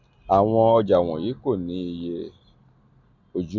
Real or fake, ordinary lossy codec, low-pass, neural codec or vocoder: real; MP3, 64 kbps; 7.2 kHz; none